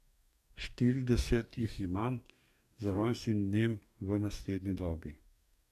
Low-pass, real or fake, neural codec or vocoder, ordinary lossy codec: 14.4 kHz; fake; codec, 44.1 kHz, 2.6 kbps, DAC; none